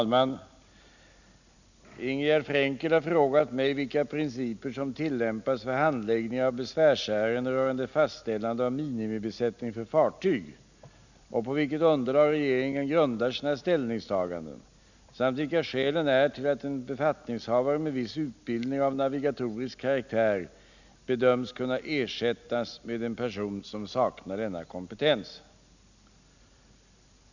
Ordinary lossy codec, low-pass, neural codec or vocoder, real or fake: none; 7.2 kHz; none; real